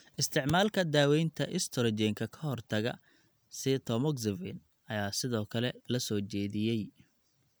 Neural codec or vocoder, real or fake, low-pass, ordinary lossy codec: none; real; none; none